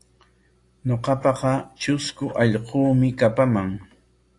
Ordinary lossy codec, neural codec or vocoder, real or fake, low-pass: AAC, 48 kbps; none; real; 10.8 kHz